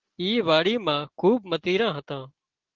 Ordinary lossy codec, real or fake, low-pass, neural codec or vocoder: Opus, 16 kbps; real; 7.2 kHz; none